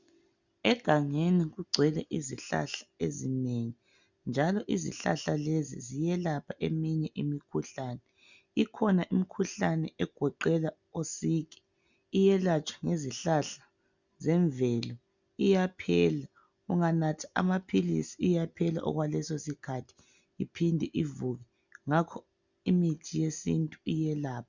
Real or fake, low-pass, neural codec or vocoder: real; 7.2 kHz; none